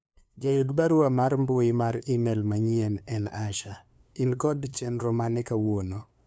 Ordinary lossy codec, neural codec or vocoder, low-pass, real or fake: none; codec, 16 kHz, 2 kbps, FunCodec, trained on LibriTTS, 25 frames a second; none; fake